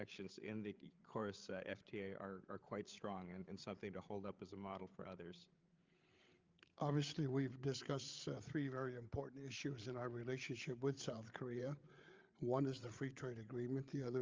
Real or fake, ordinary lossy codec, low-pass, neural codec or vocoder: fake; Opus, 32 kbps; 7.2 kHz; codec, 16 kHz, 8 kbps, FreqCodec, larger model